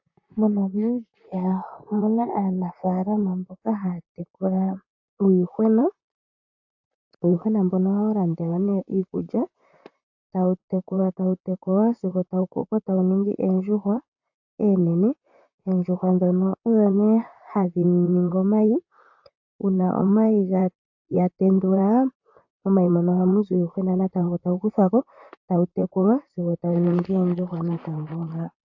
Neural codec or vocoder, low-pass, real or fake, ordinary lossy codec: vocoder, 44.1 kHz, 128 mel bands, Pupu-Vocoder; 7.2 kHz; fake; AAC, 48 kbps